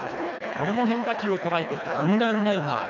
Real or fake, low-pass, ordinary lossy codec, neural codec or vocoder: fake; 7.2 kHz; none; codec, 24 kHz, 1.5 kbps, HILCodec